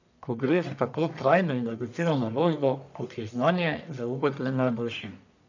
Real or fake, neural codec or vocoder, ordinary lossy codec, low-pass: fake; codec, 44.1 kHz, 1.7 kbps, Pupu-Codec; none; 7.2 kHz